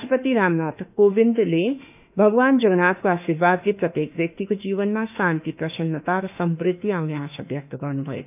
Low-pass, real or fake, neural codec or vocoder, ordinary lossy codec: 3.6 kHz; fake; autoencoder, 48 kHz, 32 numbers a frame, DAC-VAE, trained on Japanese speech; none